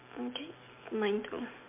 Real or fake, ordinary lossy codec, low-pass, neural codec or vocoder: real; MP3, 32 kbps; 3.6 kHz; none